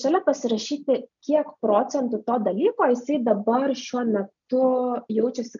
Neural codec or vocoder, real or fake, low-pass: none; real; 7.2 kHz